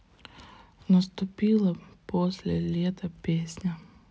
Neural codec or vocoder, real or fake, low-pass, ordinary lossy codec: none; real; none; none